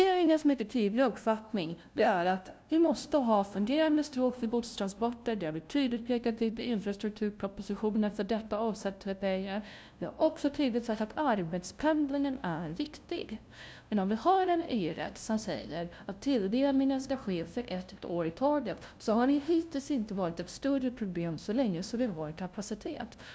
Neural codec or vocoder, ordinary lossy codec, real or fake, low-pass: codec, 16 kHz, 0.5 kbps, FunCodec, trained on LibriTTS, 25 frames a second; none; fake; none